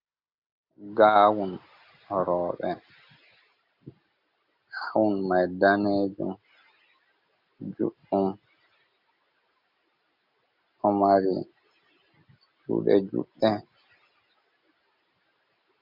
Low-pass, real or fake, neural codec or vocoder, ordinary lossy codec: 5.4 kHz; real; none; MP3, 48 kbps